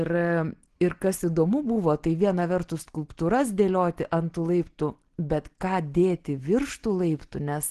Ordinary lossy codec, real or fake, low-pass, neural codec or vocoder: Opus, 16 kbps; real; 10.8 kHz; none